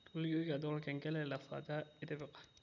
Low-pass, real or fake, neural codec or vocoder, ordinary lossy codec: 7.2 kHz; real; none; none